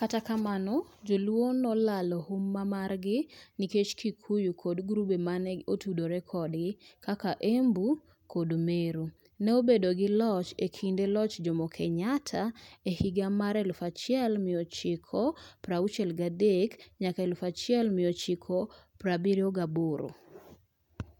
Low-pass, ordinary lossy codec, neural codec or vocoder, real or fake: 19.8 kHz; none; none; real